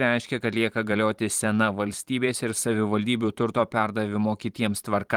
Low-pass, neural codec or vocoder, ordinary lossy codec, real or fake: 19.8 kHz; vocoder, 44.1 kHz, 128 mel bands every 512 samples, BigVGAN v2; Opus, 24 kbps; fake